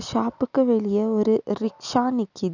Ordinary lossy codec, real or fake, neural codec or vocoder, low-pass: none; real; none; 7.2 kHz